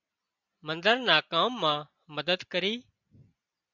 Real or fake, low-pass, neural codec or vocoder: real; 7.2 kHz; none